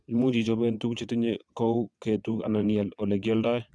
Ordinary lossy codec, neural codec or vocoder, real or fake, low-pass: none; vocoder, 22.05 kHz, 80 mel bands, WaveNeXt; fake; none